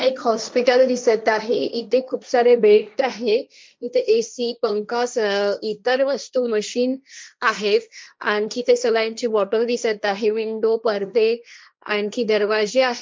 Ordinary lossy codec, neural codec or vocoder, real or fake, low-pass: none; codec, 16 kHz, 1.1 kbps, Voila-Tokenizer; fake; none